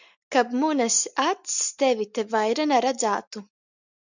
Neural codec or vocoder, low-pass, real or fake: none; 7.2 kHz; real